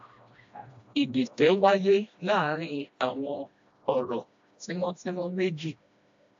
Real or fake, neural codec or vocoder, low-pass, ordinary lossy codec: fake; codec, 16 kHz, 1 kbps, FreqCodec, smaller model; 7.2 kHz; AAC, 64 kbps